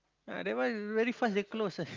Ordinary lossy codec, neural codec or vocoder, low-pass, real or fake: Opus, 24 kbps; none; 7.2 kHz; real